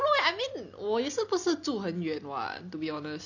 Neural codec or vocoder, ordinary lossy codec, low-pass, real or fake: none; none; 7.2 kHz; real